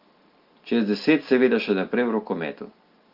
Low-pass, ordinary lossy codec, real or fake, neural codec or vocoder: 5.4 kHz; Opus, 32 kbps; real; none